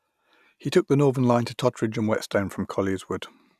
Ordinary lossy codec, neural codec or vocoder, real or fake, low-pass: none; none; real; 14.4 kHz